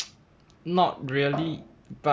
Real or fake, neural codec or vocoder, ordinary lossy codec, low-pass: real; none; none; none